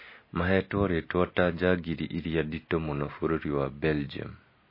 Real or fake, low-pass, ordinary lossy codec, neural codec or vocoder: real; 5.4 kHz; MP3, 24 kbps; none